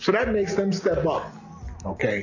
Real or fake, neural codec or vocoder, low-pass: real; none; 7.2 kHz